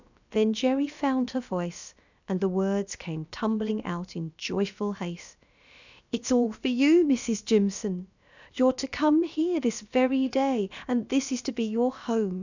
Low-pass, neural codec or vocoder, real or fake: 7.2 kHz; codec, 16 kHz, about 1 kbps, DyCAST, with the encoder's durations; fake